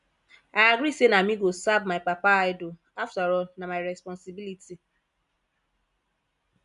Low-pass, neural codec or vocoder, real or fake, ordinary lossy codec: 10.8 kHz; none; real; none